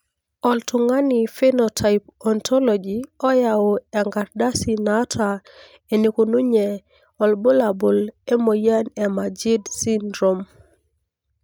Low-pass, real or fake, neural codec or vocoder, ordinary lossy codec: none; real; none; none